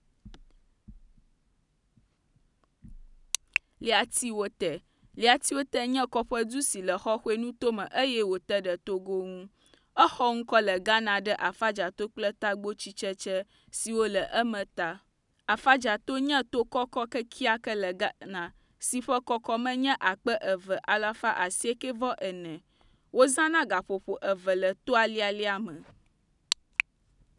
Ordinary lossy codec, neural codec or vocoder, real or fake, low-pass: none; none; real; 10.8 kHz